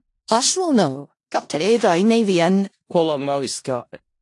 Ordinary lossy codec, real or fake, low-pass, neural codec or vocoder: AAC, 48 kbps; fake; 10.8 kHz; codec, 16 kHz in and 24 kHz out, 0.4 kbps, LongCat-Audio-Codec, four codebook decoder